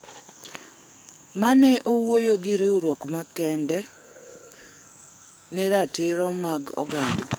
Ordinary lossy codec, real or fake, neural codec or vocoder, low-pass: none; fake; codec, 44.1 kHz, 2.6 kbps, SNAC; none